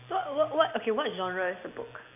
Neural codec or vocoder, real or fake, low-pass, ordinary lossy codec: none; real; 3.6 kHz; none